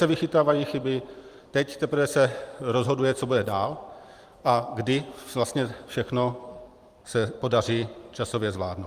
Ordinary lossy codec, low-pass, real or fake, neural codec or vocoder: Opus, 32 kbps; 14.4 kHz; fake; vocoder, 44.1 kHz, 128 mel bands every 512 samples, BigVGAN v2